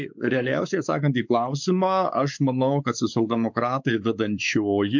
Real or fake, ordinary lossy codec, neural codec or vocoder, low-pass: fake; MP3, 64 kbps; codec, 16 kHz, 4 kbps, X-Codec, WavLM features, trained on Multilingual LibriSpeech; 7.2 kHz